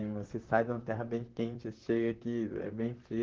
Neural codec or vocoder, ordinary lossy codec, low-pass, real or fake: vocoder, 44.1 kHz, 128 mel bands, Pupu-Vocoder; Opus, 16 kbps; 7.2 kHz; fake